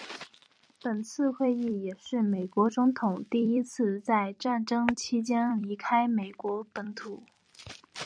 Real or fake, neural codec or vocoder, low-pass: fake; vocoder, 44.1 kHz, 128 mel bands every 256 samples, BigVGAN v2; 9.9 kHz